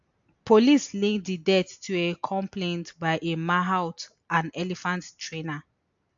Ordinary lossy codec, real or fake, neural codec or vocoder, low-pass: AAC, 64 kbps; real; none; 7.2 kHz